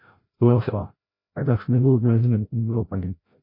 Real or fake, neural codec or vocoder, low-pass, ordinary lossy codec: fake; codec, 16 kHz, 0.5 kbps, FreqCodec, larger model; 5.4 kHz; MP3, 32 kbps